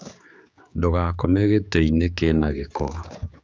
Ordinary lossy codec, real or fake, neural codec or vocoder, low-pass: none; fake; codec, 16 kHz, 4 kbps, X-Codec, HuBERT features, trained on general audio; none